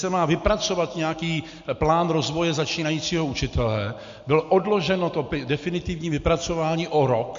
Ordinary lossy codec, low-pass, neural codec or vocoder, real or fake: MP3, 48 kbps; 7.2 kHz; none; real